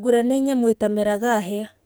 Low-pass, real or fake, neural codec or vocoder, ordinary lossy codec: none; fake; codec, 44.1 kHz, 2.6 kbps, SNAC; none